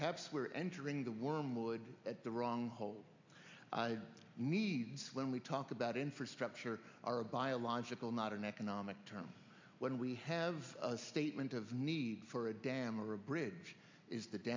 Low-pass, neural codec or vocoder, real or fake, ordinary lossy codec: 7.2 kHz; none; real; MP3, 64 kbps